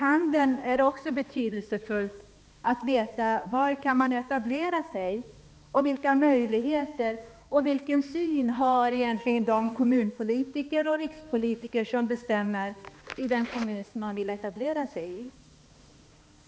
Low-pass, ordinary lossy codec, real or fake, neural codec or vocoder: none; none; fake; codec, 16 kHz, 2 kbps, X-Codec, HuBERT features, trained on balanced general audio